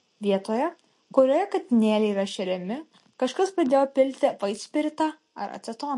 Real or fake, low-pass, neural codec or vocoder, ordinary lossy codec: real; 10.8 kHz; none; MP3, 48 kbps